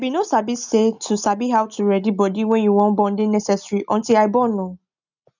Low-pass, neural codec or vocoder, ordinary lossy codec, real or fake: 7.2 kHz; none; none; real